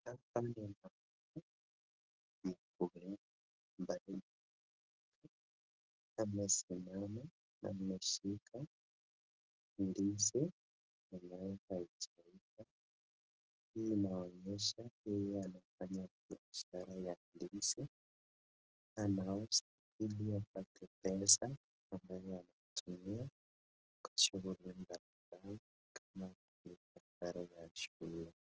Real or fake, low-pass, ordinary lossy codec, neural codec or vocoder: real; 7.2 kHz; Opus, 16 kbps; none